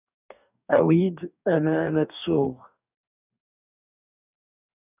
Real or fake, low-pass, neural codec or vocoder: fake; 3.6 kHz; codec, 44.1 kHz, 2.6 kbps, DAC